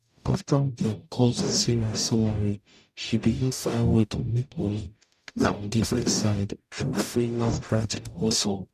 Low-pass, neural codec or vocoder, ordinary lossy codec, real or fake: 14.4 kHz; codec, 44.1 kHz, 0.9 kbps, DAC; none; fake